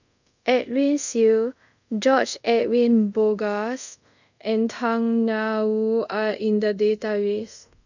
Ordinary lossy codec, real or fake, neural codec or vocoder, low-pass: none; fake; codec, 24 kHz, 0.5 kbps, DualCodec; 7.2 kHz